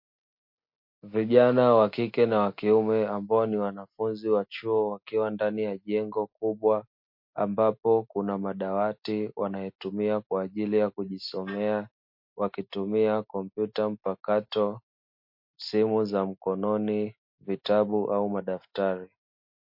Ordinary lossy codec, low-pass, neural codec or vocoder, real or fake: MP3, 32 kbps; 5.4 kHz; none; real